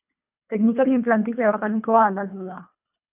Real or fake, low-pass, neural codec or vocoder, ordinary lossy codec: fake; 3.6 kHz; codec, 24 kHz, 1.5 kbps, HILCodec; AAC, 32 kbps